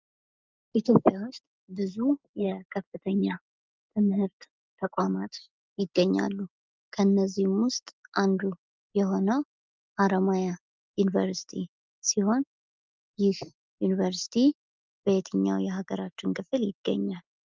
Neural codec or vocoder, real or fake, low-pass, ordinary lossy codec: none; real; 7.2 kHz; Opus, 24 kbps